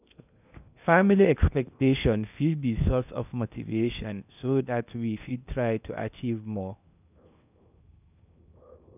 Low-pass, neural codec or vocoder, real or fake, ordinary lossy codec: 3.6 kHz; codec, 16 kHz in and 24 kHz out, 0.8 kbps, FocalCodec, streaming, 65536 codes; fake; none